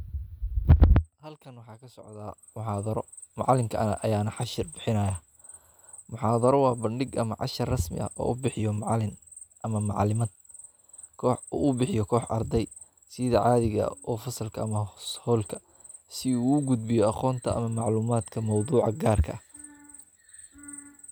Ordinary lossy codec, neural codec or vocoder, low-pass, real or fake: none; none; none; real